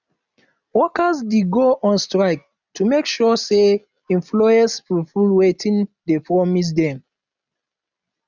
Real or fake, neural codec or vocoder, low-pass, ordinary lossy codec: real; none; 7.2 kHz; none